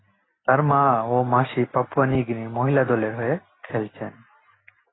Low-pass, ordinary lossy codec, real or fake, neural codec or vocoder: 7.2 kHz; AAC, 16 kbps; real; none